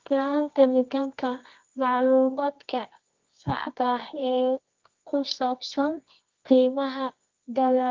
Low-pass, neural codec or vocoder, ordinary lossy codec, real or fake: 7.2 kHz; codec, 24 kHz, 0.9 kbps, WavTokenizer, medium music audio release; Opus, 32 kbps; fake